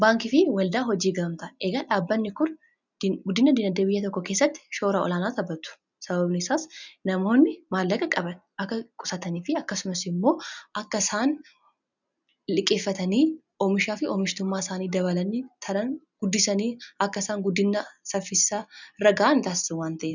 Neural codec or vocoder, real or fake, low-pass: none; real; 7.2 kHz